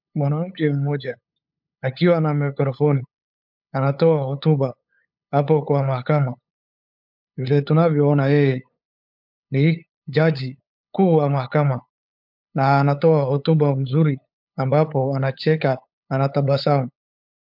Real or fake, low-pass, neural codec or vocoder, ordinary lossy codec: fake; 5.4 kHz; codec, 16 kHz, 8 kbps, FunCodec, trained on LibriTTS, 25 frames a second; MP3, 48 kbps